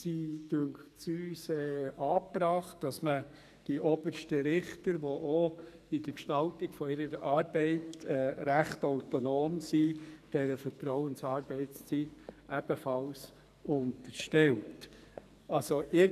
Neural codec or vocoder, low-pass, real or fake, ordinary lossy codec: codec, 44.1 kHz, 2.6 kbps, SNAC; 14.4 kHz; fake; none